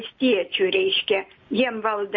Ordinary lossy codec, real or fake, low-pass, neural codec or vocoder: MP3, 32 kbps; real; 7.2 kHz; none